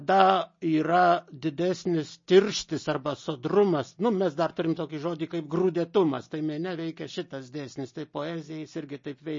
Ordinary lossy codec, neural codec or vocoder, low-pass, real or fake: MP3, 32 kbps; none; 7.2 kHz; real